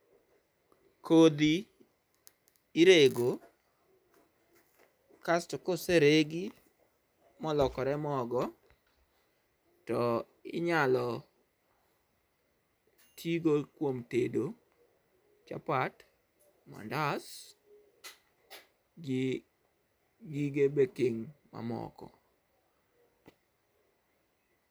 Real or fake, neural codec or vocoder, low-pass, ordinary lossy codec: real; none; none; none